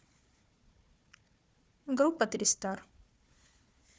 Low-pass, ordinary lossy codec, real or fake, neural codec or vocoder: none; none; fake; codec, 16 kHz, 4 kbps, FunCodec, trained on Chinese and English, 50 frames a second